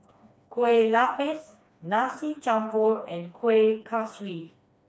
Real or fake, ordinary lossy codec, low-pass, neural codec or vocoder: fake; none; none; codec, 16 kHz, 2 kbps, FreqCodec, smaller model